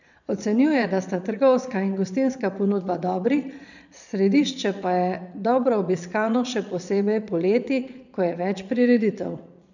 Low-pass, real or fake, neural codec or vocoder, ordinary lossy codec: 7.2 kHz; fake; vocoder, 22.05 kHz, 80 mel bands, Vocos; none